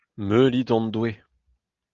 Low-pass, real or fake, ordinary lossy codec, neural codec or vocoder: 7.2 kHz; real; Opus, 32 kbps; none